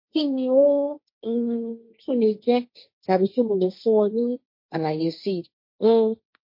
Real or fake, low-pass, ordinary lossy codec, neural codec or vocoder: fake; 5.4 kHz; MP3, 32 kbps; codec, 16 kHz, 1.1 kbps, Voila-Tokenizer